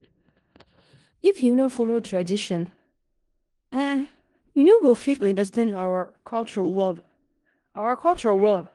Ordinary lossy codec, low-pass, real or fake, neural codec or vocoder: Opus, 24 kbps; 10.8 kHz; fake; codec, 16 kHz in and 24 kHz out, 0.4 kbps, LongCat-Audio-Codec, four codebook decoder